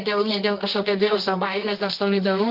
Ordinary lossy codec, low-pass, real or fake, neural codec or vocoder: Opus, 32 kbps; 5.4 kHz; fake; codec, 24 kHz, 0.9 kbps, WavTokenizer, medium music audio release